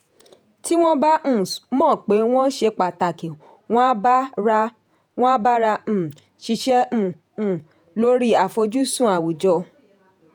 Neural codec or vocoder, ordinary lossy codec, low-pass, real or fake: vocoder, 48 kHz, 128 mel bands, Vocos; none; 19.8 kHz; fake